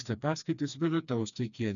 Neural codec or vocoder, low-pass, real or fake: codec, 16 kHz, 2 kbps, FreqCodec, smaller model; 7.2 kHz; fake